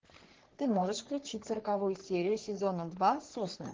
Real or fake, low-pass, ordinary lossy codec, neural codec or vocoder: fake; 7.2 kHz; Opus, 16 kbps; codec, 44.1 kHz, 3.4 kbps, Pupu-Codec